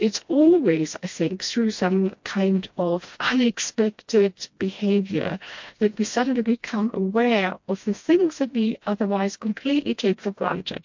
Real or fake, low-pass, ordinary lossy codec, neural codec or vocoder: fake; 7.2 kHz; MP3, 48 kbps; codec, 16 kHz, 1 kbps, FreqCodec, smaller model